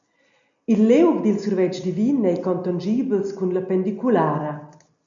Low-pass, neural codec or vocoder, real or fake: 7.2 kHz; none; real